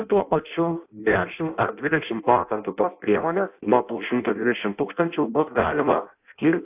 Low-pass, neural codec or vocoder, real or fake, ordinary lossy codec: 3.6 kHz; codec, 16 kHz in and 24 kHz out, 0.6 kbps, FireRedTTS-2 codec; fake; AAC, 32 kbps